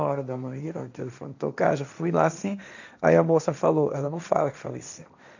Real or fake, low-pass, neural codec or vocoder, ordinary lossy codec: fake; 7.2 kHz; codec, 16 kHz, 1.1 kbps, Voila-Tokenizer; none